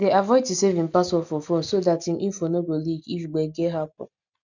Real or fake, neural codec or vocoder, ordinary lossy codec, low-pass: real; none; none; 7.2 kHz